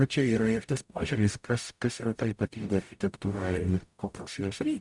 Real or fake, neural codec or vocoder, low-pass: fake; codec, 44.1 kHz, 0.9 kbps, DAC; 10.8 kHz